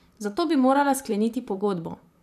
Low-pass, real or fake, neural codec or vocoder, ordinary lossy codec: 14.4 kHz; fake; vocoder, 44.1 kHz, 128 mel bands, Pupu-Vocoder; none